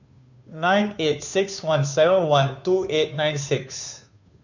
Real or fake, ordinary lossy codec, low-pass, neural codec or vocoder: fake; none; 7.2 kHz; codec, 16 kHz, 2 kbps, FunCodec, trained on Chinese and English, 25 frames a second